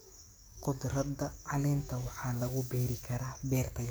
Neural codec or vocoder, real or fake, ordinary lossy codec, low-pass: codec, 44.1 kHz, 7.8 kbps, DAC; fake; none; none